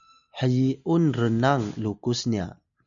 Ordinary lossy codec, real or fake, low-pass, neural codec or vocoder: MP3, 96 kbps; real; 7.2 kHz; none